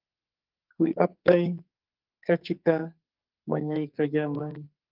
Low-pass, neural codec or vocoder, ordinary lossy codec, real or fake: 5.4 kHz; codec, 44.1 kHz, 2.6 kbps, SNAC; Opus, 32 kbps; fake